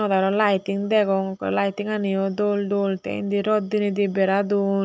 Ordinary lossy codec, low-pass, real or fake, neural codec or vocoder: none; none; real; none